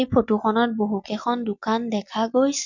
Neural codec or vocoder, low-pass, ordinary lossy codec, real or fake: none; 7.2 kHz; none; real